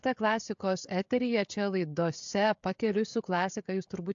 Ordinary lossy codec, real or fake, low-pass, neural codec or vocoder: AAC, 64 kbps; fake; 7.2 kHz; codec, 16 kHz, 16 kbps, FreqCodec, smaller model